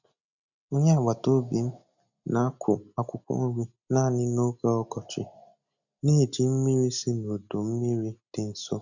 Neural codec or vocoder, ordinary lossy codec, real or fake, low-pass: none; none; real; 7.2 kHz